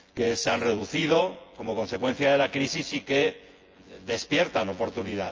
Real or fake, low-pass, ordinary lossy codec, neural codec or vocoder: fake; 7.2 kHz; Opus, 24 kbps; vocoder, 24 kHz, 100 mel bands, Vocos